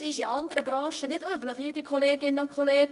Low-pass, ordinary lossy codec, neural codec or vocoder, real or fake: 10.8 kHz; none; codec, 24 kHz, 0.9 kbps, WavTokenizer, medium music audio release; fake